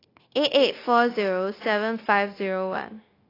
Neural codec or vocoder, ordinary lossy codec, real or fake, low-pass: codec, 16 kHz, 0.9 kbps, LongCat-Audio-Codec; AAC, 24 kbps; fake; 5.4 kHz